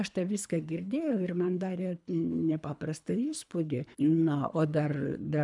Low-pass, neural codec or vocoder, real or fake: 10.8 kHz; codec, 24 kHz, 3 kbps, HILCodec; fake